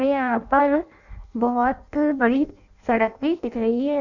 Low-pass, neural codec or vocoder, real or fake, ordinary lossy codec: 7.2 kHz; codec, 16 kHz in and 24 kHz out, 0.6 kbps, FireRedTTS-2 codec; fake; none